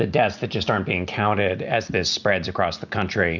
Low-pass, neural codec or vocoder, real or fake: 7.2 kHz; none; real